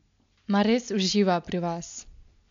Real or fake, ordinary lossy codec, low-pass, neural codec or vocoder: real; MP3, 64 kbps; 7.2 kHz; none